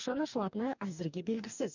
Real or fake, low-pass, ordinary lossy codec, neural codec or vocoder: fake; 7.2 kHz; none; codec, 44.1 kHz, 2.6 kbps, DAC